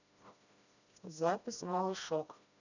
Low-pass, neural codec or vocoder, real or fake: 7.2 kHz; codec, 16 kHz, 1 kbps, FreqCodec, smaller model; fake